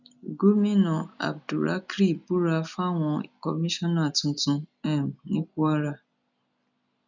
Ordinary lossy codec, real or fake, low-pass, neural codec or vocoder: none; real; 7.2 kHz; none